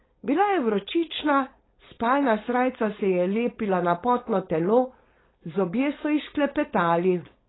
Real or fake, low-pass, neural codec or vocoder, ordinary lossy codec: fake; 7.2 kHz; codec, 16 kHz, 4.8 kbps, FACodec; AAC, 16 kbps